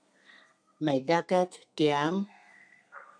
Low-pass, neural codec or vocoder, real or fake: 9.9 kHz; codec, 32 kHz, 1.9 kbps, SNAC; fake